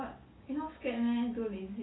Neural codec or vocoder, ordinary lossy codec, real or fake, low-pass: none; AAC, 16 kbps; real; 7.2 kHz